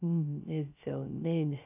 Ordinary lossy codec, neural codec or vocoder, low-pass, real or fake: none; codec, 16 kHz, 0.3 kbps, FocalCodec; 3.6 kHz; fake